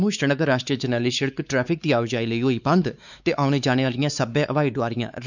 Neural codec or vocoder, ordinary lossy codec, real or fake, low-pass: codec, 16 kHz, 4 kbps, X-Codec, WavLM features, trained on Multilingual LibriSpeech; none; fake; 7.2 kHz